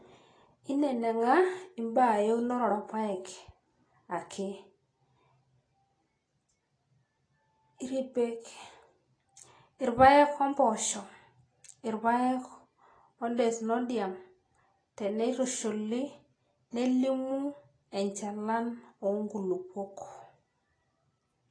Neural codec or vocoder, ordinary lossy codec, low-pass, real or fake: none; AAC, 32 kbps; 9.9 kHz; real